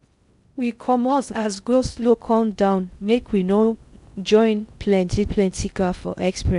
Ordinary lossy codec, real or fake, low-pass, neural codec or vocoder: none; fake; 10.8 kHz; codec, 16 kHz in and 24 kHz out, 0.6 kbps, FocalCodec, streaming, 2048 codes